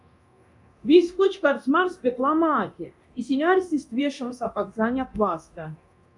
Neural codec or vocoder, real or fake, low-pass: codec, 24 kHz, 0.9 kbps, DualCodec; fake; 10.8 kHz